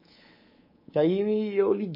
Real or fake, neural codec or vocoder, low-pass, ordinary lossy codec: fake; codec, 24 kHz, 3.1 kbps, DualCodec; 5.4 kHz; MP3, 24 kbps